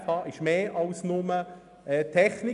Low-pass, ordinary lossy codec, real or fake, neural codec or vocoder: 10.8 kHz; none; real; none